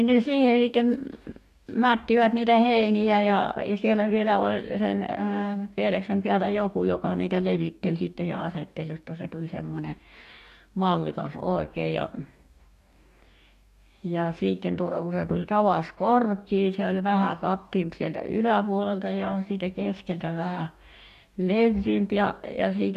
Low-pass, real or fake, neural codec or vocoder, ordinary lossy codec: 14.4 kHz; fake; codec, 44.1 kHz, 2.6 kbps, DAC; none